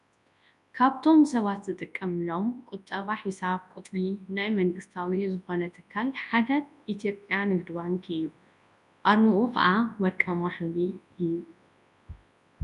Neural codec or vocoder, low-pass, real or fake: codec, 24 kHz, 0.9 kbps, WavTokenizer, large speech release; 10.8 kHz; fake